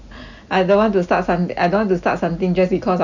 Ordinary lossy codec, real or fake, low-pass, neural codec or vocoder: none; real; 7.2 kHz; none